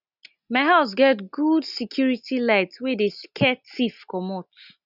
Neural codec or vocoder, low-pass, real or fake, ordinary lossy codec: none; 5.4 kHz; real; none